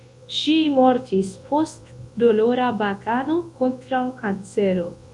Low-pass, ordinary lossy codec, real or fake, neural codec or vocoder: 10.8 kHz; MP3, 48 kbps; fake; codec, 24 kHz, 0.9 kbps, WavTokenizer, large speech release